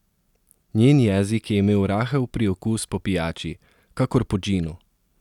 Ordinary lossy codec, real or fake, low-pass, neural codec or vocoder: none; real; 19.8 kHz; none